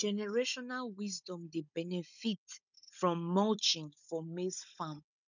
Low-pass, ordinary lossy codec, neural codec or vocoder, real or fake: 7.2 kHz; none; codec, 16 kHz, 16 kbps, FunCodec, trained on Chinese and English, 50 frames a second; fake